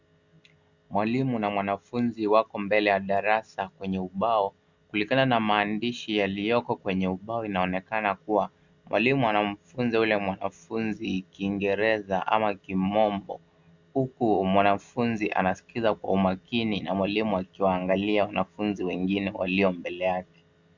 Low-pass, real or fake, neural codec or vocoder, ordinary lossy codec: 7.2 kHz; real; none; Opus, 64 kbps